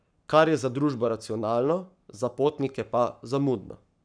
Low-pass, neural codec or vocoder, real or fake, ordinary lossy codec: 9.9 kHz; codec, 44.1 kHz, 7.8 kbps, Pupu-Codec; fake; none